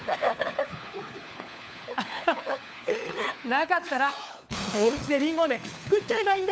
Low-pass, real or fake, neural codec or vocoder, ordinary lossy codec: none; fake; codec, 16 kHz, 4 kbps, FunCodec, trained on LibriTTS, 50 frames a second; none